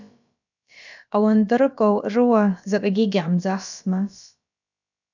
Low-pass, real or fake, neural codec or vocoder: 7.2 kHz; fake; codec, 16 kHz, about 1 kbps, DyCAST, with the encoder's durations